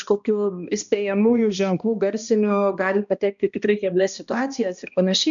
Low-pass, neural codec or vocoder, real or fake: 7.2 kHz; codec, 16 kHz, 1 kbps, X-Codec, HuBERT features, trained on balanced general audio; fake